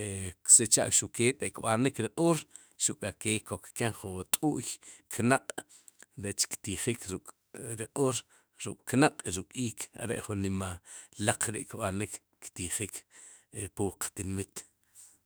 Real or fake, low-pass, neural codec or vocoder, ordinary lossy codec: fake; none; autoencoder, 48 kHz, 32 numbers a frame, DAC-VAE, trained on Japanese speech; none